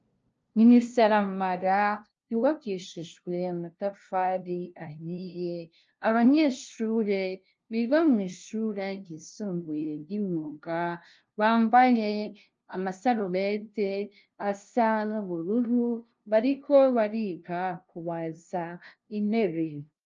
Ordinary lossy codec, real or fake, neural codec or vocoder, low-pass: Opus, 24 kbps; fake; codec, 16 kHz, 0.5 kbps, FunCodec, trained on LibriTTS, 25 frames a second; 7.2 kHz